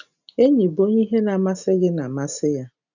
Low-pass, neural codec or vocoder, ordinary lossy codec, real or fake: 7.2 kHz; none; none; real